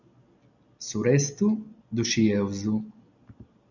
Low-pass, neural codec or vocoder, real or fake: 7.2 kHz; none; real